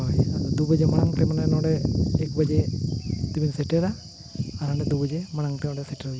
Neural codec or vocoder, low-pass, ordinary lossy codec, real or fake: none; none; none; real